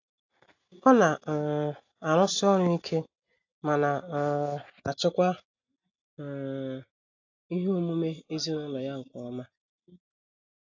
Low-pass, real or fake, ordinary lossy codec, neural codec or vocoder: 7.2 kHz; real; AAC, 48 kbps; none